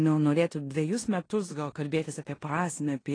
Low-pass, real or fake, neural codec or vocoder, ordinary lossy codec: 9.9 kHz; fake; codec, 16 kHz in and 24 kHz out, 0.9 kbps, LongCat-Audio-Codec, four codebook decoder; AAC, 32 kbps